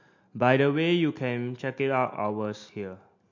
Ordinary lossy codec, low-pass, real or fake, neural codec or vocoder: MP3, 48 kbps; 7.2 kHz; real; none